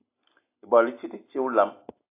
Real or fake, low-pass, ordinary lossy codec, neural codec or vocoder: real; 3.6 kHz; AAC, 24 kbps; none